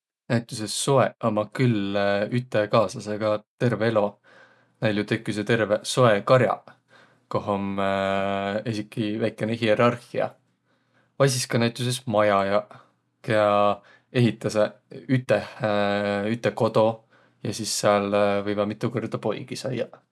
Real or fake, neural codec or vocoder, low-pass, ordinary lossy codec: real; none; none; none